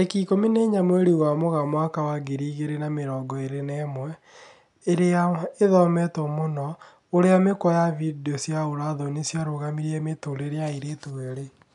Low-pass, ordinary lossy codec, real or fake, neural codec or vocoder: 10.8 kHz; none; real; none